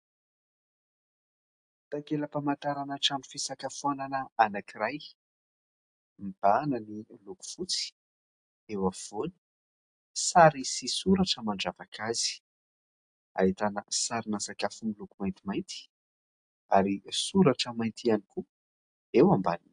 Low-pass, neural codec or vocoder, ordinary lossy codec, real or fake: 9.9 kHz; none; AAC, 64 kbps; real